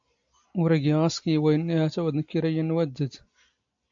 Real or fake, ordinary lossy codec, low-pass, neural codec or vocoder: real; AAC, 64 kbps; 7.2 kHz; none